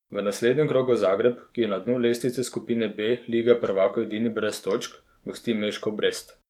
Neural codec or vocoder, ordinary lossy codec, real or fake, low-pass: codec, 44.1 kHz, 7.8 kbps, DAC; none; fake; 19.8 kHz